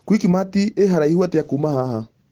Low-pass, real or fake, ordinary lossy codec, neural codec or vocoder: 19.8 kHz; real; Opus, 16 kbps; none